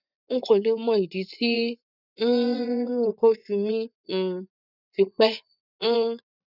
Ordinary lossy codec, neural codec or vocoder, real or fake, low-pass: none; vocoder, 24 kHz, 100 mel bands, Vocos; fake; 5.4 kHz